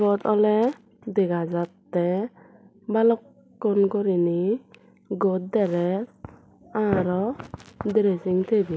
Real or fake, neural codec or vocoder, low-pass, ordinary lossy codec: real; none; none; none